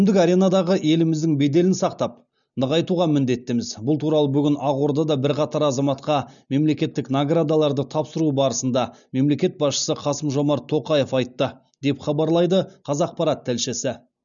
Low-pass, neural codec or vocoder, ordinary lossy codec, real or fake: 7.2 kHz; none; none; real